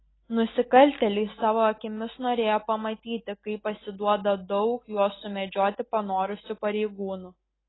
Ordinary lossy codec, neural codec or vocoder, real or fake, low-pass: AAC, 16 kbps; none; real; 7.2 kHz